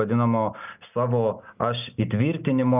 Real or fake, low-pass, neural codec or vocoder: real; 3.6 kHz; none